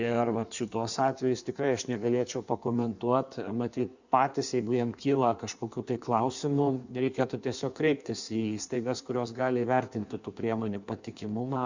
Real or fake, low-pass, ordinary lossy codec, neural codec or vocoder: fake; 7.2 kHz; Opus, 64 kbps; codec, 16 kHz in and 24 kHz out, 1.1 kbps, FireRedTTS-2 codec